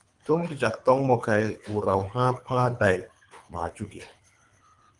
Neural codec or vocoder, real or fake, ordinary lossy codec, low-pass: codec, 24 kHz, 3 kbps, HILCodec; fake; Opus, 32 kbps; 10.8 kHz